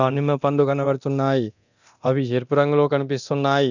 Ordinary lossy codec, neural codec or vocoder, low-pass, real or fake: none; codec, 24 kHz, 0.9 kbps, DualCodec; 7.2 kHz; fake